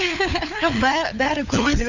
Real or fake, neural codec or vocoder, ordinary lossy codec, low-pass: fake; codec, 16 kHz, 4 kbps, FunCodec, trained on LibriTTS, 50 frames a second; none; 7.2 kHz